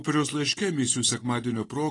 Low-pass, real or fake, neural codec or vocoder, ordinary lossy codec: 10.8 kHz; real; none; AAC, 32 kbps